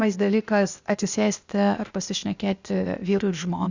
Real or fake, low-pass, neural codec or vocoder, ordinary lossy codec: fake; 7.2 kHz; codec, 16 kHz, 0.8 kbps, ZipCodec; Opus, 64 kbps